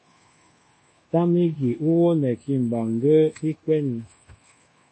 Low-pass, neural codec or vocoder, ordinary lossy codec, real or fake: 10.8 kHz; codec, 24 kHz, 1.2 kbps, DualCodec; MP3, 32 kbps; fake